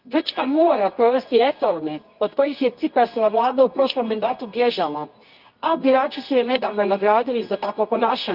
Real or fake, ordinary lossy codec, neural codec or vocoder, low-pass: fake; Opus, 24 kbps; codec, 24 kHz, 0.9 kbps, WavTokenizer, medium music audio release; 5.4 kHz